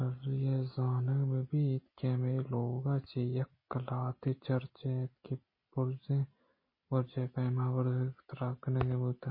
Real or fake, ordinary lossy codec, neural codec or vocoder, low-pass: real; MP3, 24 kbps; none; 5.4 kHz